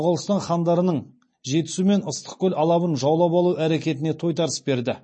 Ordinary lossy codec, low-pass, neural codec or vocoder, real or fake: MP3, 32 kbps; 9.9 kHz; none; real